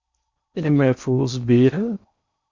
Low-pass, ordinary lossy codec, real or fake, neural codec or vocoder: 7.2 kHz; Opus, 64 kbps; fake; codec, 16 kHz in and 24 kHz out, 0.6 kbps, FocalCodec, streaming, 4096 codes